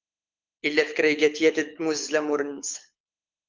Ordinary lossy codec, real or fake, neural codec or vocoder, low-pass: Opus, 24 kbps; fake; codec, 24 kHz, 6 kbps, HILCodec; 7.2 kHz